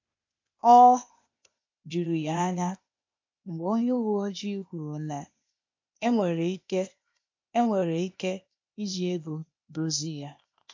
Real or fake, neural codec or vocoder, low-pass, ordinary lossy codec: fake; codec, 16 kHz, 0.8 kbps, ZipCodec; 7.2 kHz; MP3, 48 kbps